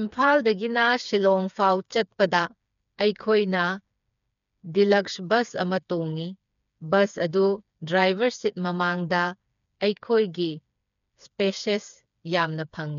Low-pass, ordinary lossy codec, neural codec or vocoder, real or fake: 7.2 kHz; none; codec, 16 kHz, 4 kbps, FreqCodec, smaller model; fake